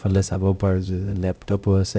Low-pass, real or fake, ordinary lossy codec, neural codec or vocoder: none; fake; none; codec, 16 kHz, 0.5 kbps, X-Codec, HuBERT features, trained on LibriSpeech